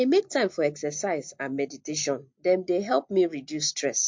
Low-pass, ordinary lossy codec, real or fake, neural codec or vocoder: 7.2 kHz; MP3, 48 kbps; real; none